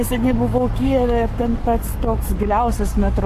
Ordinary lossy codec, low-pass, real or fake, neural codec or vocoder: AAC, 64 kbps; 14.4 kHz; fake; codec, 44.1 kHz, 7.8 kbps, Pupu-Codec